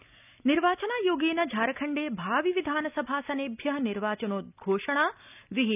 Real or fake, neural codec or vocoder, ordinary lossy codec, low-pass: real; none; none; 3.6 kHz